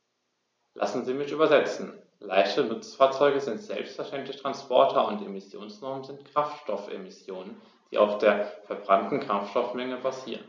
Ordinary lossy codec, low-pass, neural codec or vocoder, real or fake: none; none; none; real